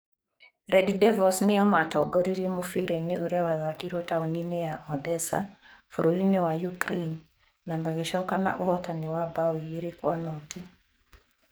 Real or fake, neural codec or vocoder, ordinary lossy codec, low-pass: fake; codec, 44.1 kHz, 2.6 kbps, SNAC; none; none